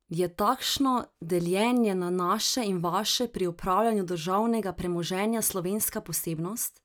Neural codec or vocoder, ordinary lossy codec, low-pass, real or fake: none; none; none; real